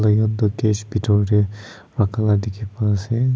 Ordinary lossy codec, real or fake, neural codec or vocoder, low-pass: none; real; none; none